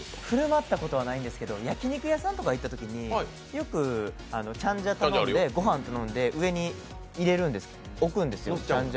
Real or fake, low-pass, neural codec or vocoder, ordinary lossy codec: real; none; none; none